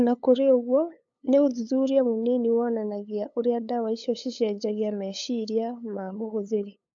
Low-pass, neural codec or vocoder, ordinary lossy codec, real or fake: 7.2 kHz; codec, 16 kHz, 8 kbps, FunCodec, trained on LibriTTS, 25 frames a second; AAC, 64 kbps; fake